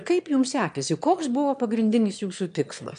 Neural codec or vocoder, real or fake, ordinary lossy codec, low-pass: autoencoder, 22.05 kHz, a latent of 192 numbers a frame, VITS, trained on one speaker; fake; MP3, 64 kbps; 9.9 kHz